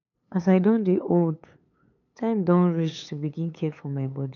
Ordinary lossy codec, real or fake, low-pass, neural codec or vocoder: none; fake; 7.2 kHz; codec, 16 kHz, 8 kbps, FunCodec, trained on LibriTTS, 25 frames a second